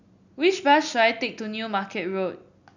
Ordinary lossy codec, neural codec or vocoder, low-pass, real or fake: none; none; 7.2 kHz; real